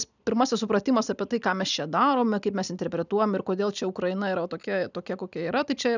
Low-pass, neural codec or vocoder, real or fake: 7.2 kHz; none; real